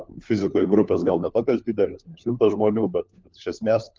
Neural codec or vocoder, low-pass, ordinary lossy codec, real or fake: codec, 16 kHz, 4 kbps, FunCodec, trained on LibriTTS, 50 frames a second; 7.2 kHz; Opus, 32 kbps; fake